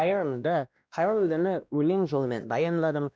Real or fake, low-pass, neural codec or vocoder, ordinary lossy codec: fake; none; codec, 16 kHz, 1 kbps, X-Codec, WavLM features, trained on Multilingual LibriSpeech; none